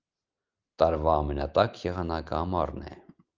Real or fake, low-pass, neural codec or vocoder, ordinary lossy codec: real; 7.2 kHz; none; Opus, 32 kbps